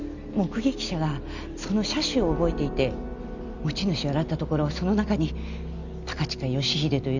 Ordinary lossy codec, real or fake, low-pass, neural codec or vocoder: none; real; 7.2 kHz; none